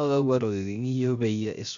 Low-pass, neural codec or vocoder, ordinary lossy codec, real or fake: 7.2 kHz; codec, 16 kHz, 0.3 kbps, FocalCodec; none; fake